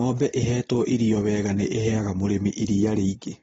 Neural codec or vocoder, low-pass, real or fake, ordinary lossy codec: none; 19.8 kHz; real; AAC, 24 kbps